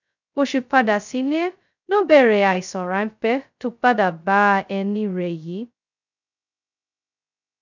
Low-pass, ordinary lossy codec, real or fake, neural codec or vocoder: 7.2 kHz; none; fake; codec, 16 kHz, 0.2 kbps, FocalCodec